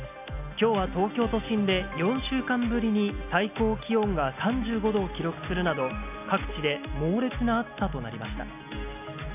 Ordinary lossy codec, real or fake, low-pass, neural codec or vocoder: none; real; 3.6 kHz; none